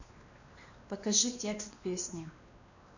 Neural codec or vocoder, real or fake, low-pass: codec, 16 kHz, 2 kbps, X-Codec, WavLM features, trained on Multilingual LibriSpeech; fake; 7.2 kHz